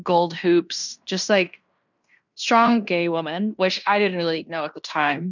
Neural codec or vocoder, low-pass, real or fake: codec, 16 kHz in and 24 kHz out, 0.9 kbps, LongCat-Audio-Codec, fine tuned four codebook decoder; 7.2 kHz; fake